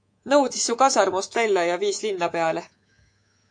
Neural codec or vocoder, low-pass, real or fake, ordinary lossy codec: codec, 24 kHz, 3.1 kbps, DualCodec; 9.9 kHz; fake; AAC, 64 kbps